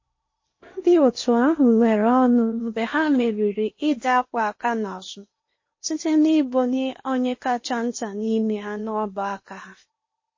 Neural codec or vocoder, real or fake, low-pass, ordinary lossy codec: codec, 16 kHz in and 24 kHz out, 0.8 kbps, FocalCodec, streaming, 65536 codes; fake; 7.2 kHz; MP3, 32 kbps